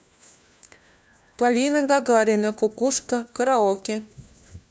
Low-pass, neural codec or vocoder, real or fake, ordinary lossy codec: none; codec, 16 kHz, 1 kbps, FunCodec, trained on LibriTTS, 50 frames a second; fake; none